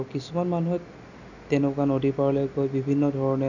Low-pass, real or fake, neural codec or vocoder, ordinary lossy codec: 7.2 kHz; real; none; none